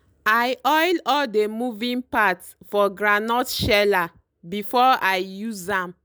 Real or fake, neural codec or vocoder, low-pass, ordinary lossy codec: real; none; none; none